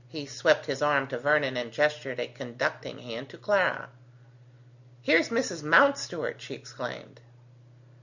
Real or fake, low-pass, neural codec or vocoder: real; 7.2 kHz; none